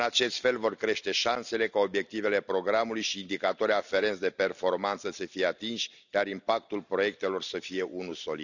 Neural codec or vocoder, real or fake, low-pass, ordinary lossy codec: none; real; 7.2 kHz; none